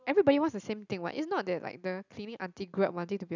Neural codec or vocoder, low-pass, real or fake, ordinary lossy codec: none; 7.2 kHz; real; none